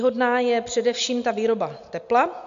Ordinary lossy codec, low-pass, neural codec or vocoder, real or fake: MP3, 64 kbps; 7.2 kHz; none; real